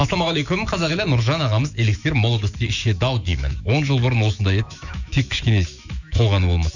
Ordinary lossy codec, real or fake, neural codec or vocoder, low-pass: none; fake; autoencoder, 48 kHz, 128 numbers a frame, DAC-VAE, trained on Japanese speech; 7.2 kHz